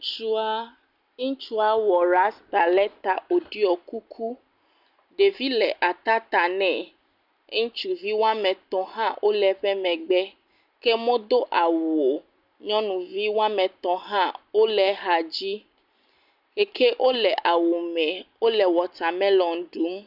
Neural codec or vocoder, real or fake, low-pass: none; real; 5.4 kHz